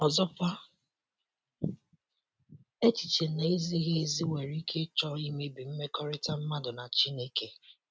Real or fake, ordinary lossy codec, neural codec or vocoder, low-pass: real; none; none; none